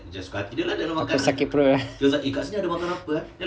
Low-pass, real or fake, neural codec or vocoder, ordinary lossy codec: none; real; none; none